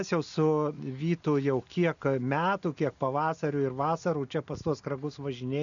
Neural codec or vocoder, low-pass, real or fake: none; 7.2 kHz; real